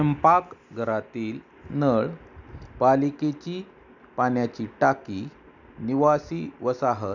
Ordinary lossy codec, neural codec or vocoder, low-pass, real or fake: none; none; 7.2 kHz; real